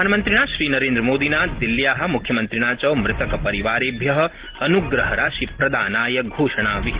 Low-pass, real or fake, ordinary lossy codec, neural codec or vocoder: 3.6 kHz; real; Opus, 16 kbps; none